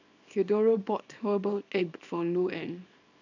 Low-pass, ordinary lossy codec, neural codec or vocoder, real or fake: 7.2 kHz; none; codec, 24 kHz, 0.9 kbps, WavTokenizer, small release; fake